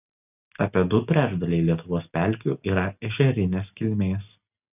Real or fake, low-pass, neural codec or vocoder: real; 3.6 kHz; none